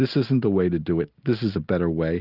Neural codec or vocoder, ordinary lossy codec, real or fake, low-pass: none; Opus, 32 kbps; real; 5.4 kHz